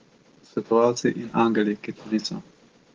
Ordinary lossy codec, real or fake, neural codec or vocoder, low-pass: Opus, 16 kbps; real; none; 7.2 kHz